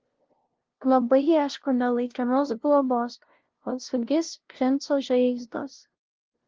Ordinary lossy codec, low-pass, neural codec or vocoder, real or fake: Opus, 16 kbps; 7.2 kHz; codec, 16 kHz, 0.5 kbps, FunCodec, trained on LibriTTS, 25 frames a second; fake